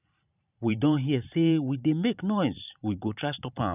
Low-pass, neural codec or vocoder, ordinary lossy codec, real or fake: 3.6 kHz; vocoder, 44.1 kHz, 128 mel bands every 512 samples, BigVGAN v2; none; fake